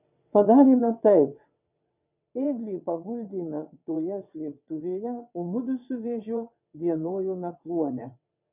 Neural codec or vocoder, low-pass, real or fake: vocoder, 22.05 kHz, 80 mel bands, WaveNeXt; 3.6 kHz; fake